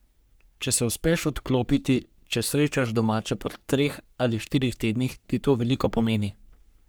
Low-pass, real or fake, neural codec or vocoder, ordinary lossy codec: none; fake; codec, 44.1 kHz, 3.4 kbps, Pupu-Codec; none